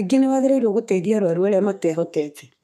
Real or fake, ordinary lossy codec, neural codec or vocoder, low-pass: fake; none; codec, 32 kHz, 1.9 kbps, SNAC; 14.4 kHz